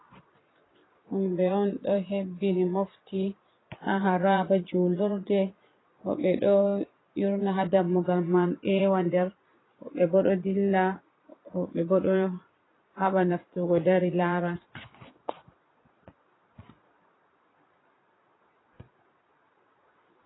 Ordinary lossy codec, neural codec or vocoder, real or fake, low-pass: AAC, 16 kbps; vocoder, 22.05 kHz, 80 mel bands, Vocos; fake; 7.2 kHz